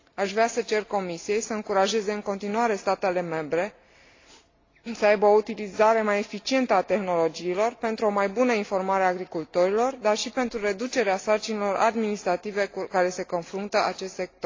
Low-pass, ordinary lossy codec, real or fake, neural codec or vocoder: 7.2 kHz; AAC, 32 kbps; real; none